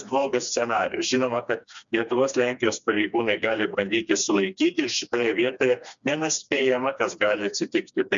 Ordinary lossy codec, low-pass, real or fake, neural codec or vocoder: MP3, 64 kbps; 7.2 kHz; fake; codec, 16 kHz, 2 kbps, FreqCodec, smaller model